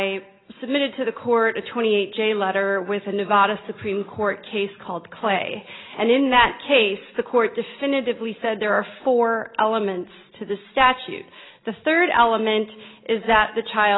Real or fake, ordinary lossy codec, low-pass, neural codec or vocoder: real; AAC, 16 kbps; 7.2 kHz; none